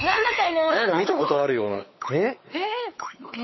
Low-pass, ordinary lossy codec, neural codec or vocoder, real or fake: 7.2 kHz; MP3, 24 kbps; codec, 16 kHz, 4 kbps, X-Codec, WavLM features, trained on Multilingual LibriSpeech; fake